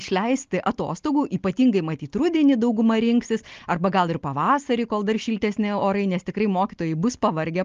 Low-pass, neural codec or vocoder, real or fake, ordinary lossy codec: 7.2 kHz; none; real; Opus, 32 kbps